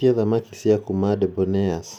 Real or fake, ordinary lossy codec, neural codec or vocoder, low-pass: real; none; none; 19.8 kHz